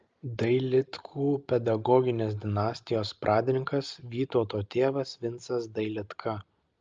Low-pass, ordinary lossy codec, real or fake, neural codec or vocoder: 7.2 kHz; Opus, 24 kbps; real; none